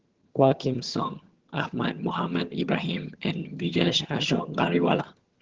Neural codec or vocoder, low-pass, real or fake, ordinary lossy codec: vocoder, 22.05 kHz, 80 mel bands, HiFi-GAN; 7.2 kHz; fake; Opus, 16 kbps